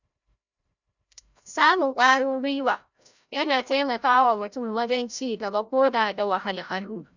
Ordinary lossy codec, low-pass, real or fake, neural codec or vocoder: none; 7.2 kHz; fake; codec, 16 kHz, 0.5 kbps, FreqCodec, larger model